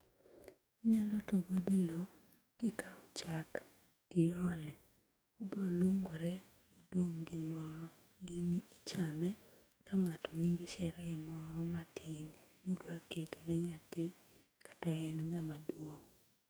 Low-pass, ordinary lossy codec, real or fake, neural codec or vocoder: none; none; fake; codec, 44.1 kHz, 2.6 kbps, DAC